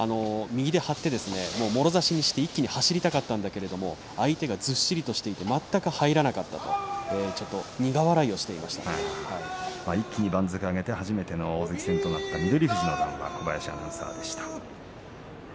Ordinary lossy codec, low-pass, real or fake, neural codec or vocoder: none; none; real; none